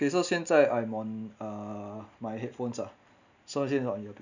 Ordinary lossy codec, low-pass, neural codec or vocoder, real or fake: none; 7.2 kHz; none; real